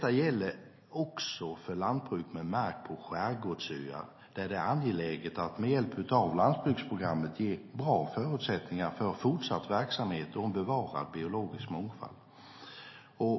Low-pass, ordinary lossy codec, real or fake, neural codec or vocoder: 7.2 kHz; MP3, 24 kbps; real; none